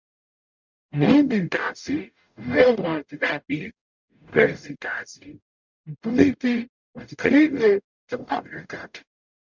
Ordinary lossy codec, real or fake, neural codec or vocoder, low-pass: MP3, 64 kbps; fake; codec, 44.1 kHz, 0.9 kbps, DAC; 7.2 kHz